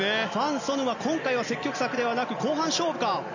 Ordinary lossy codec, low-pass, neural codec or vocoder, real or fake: none; 7.2 kHz; none; real